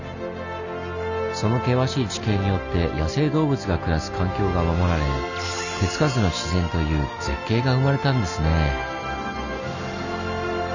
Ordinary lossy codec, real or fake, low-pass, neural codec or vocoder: none; real; 7.2 kHz; none